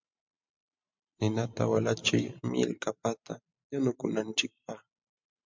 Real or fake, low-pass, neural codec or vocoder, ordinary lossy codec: fake; 7.2 kHz; vocoder, 44.1 kHz, 128 mel bands every 512 samples, BigVGAN v2; MP3, 64 kbps